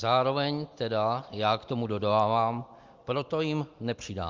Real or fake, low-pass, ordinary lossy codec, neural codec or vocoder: real; 7.2 kHz; Opus, 24 kbps; none